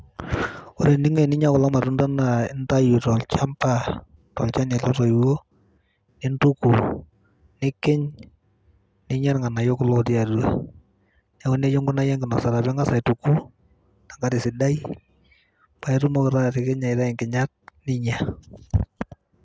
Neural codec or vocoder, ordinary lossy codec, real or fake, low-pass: none; none; real; none